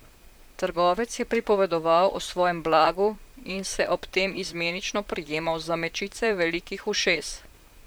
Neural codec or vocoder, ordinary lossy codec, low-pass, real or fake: vocoder, 44.1 kHz, 128 mel bands, Pupu-Vocoder; none; none; fake